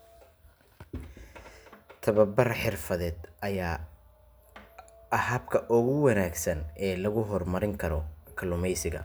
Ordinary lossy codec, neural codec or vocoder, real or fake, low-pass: none; none; real; none